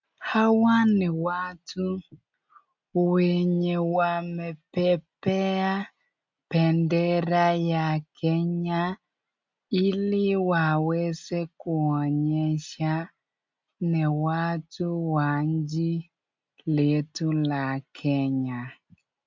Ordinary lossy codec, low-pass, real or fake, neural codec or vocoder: MP3, 64 kbps; 7.2 kHz; real; none